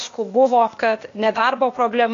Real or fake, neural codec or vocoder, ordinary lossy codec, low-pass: fake; codec, 16 kHz, 0.8 kbps, ZipCodec; AAC, 48 kbps; 7.2 kHz